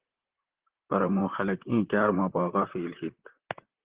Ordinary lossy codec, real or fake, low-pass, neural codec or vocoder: Opus, 16 kbps; fake; 3.6 kHz; vocoder, 44.1 kHz, 128 mel bands, Pupu-Vocoder